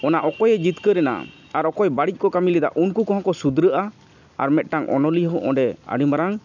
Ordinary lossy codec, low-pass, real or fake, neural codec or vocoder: none; 7.2 kHz; real; none